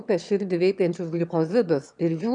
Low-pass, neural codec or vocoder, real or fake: 9.9 kHz; autoencoder, 22.05 kHz, a latent of 192 numbers a frame, VITS, trained on one speaker; fake